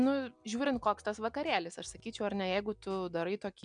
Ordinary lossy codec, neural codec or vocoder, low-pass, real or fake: MP3, 96 kbps; none; 9.9 kHz; real